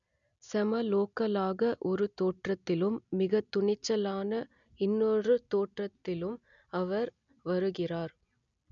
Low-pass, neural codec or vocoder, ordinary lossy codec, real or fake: 7.2 kHz; none; none; real